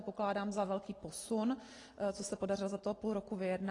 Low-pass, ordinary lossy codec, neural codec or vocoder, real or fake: 10.8 kHz; AAC, 32 kbps; none; real